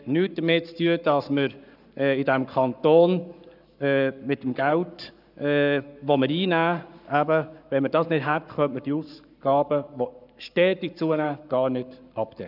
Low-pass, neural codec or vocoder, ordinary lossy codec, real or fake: 5.4 kHz; codec, 44.1 kHz, 7.8 kbps, Pupu-Codec; none; fake